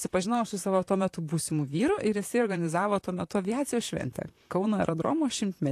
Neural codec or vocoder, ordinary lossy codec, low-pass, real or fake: vocoder, 44.1 kHz, 128 mel bands, Pupu-Vocoder; AAC, 64 kbps; 14.4 kHz; fake